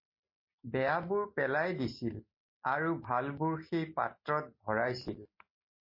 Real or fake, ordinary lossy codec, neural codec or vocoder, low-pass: real; MP3, 24 kbps; none; 5.4 kHz